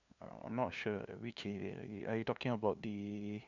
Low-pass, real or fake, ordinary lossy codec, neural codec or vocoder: 7.2 kHz; fake; none; codec, 16 kHz, 2 kbps, FunCodec, trained on LibriTTS, 25 frames a second